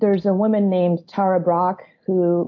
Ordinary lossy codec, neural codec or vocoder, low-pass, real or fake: AAC, 48 kbps; none; 7.2 kHz; real